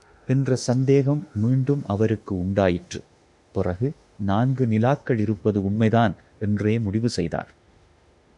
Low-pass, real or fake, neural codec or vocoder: 10.8 kHz; fake; autoencoder, 48 kHz, 32 numbers a frame, DAC-VAE, trained on Japanese speech